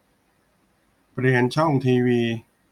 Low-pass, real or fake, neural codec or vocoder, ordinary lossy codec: 19.8 kHz; real; none; none